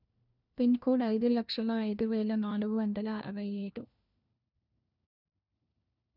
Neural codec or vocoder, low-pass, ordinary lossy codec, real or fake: codec, 16 kHz, 1 kbps, FunCodec, trained on LibriTTS, 50 frames a second; 5.4 kHz; none; fake